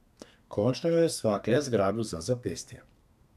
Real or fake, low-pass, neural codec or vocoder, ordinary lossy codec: fake; 14.4 kHz; codec, 32 kHz, 1.9 kbps, SNAC; none